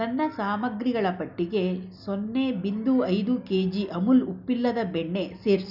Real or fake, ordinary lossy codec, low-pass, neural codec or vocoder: real; none; 5.4 kHz; none